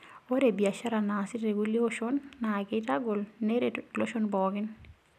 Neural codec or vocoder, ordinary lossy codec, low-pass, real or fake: none; none; 14.4 kHz; real